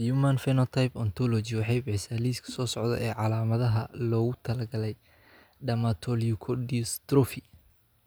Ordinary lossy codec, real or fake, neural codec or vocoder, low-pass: none; real; none; none